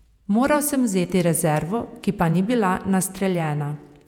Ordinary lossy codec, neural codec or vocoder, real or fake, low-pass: none; vocoder, 48 kHz, 128 mel bands, Vocos; fake; 19.8 kHz